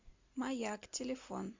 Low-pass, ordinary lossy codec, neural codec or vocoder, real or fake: 7.2 kHz; MP3, 64 kbps; none; real